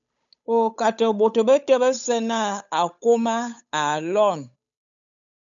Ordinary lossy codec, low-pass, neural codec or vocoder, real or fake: MP3, 96 kbps; 7.2 kHz; codec, 16 kHz, 8 kbps, FunCodec, trained on Chinese and English, 25 frames a second; fake